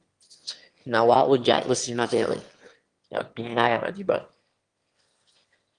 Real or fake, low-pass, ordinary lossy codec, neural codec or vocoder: fake; 9.9 kHz; Opus, 24 kbps; autoencoder, 22.05 kHz, a latent of 192 numbers a frame, VITS, trained on one speaker